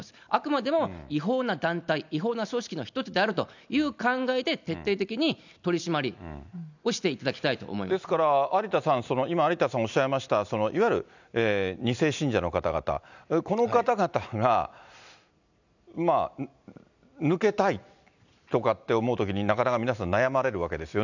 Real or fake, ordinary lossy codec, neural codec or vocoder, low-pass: real; none; none; 7.2 kHz